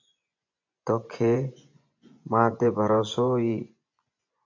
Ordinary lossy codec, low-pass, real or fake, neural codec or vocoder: AAC, 48 kbps; 7.2 kHz; fake; vocoder, 24 kHz, 100 mel bands, Vocos